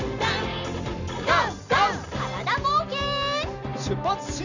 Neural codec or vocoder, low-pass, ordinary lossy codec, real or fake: none; 7.2 kHz; none; real